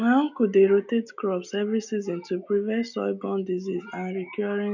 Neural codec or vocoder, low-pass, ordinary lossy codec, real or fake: none; 7.2 kHz; none; real